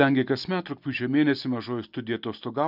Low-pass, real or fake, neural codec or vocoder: 5.4 kHz; real; none